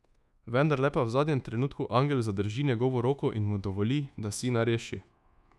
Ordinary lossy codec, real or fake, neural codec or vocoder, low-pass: none; fake; codec, 24 kHz, 1.2 kbps, DualCodec; none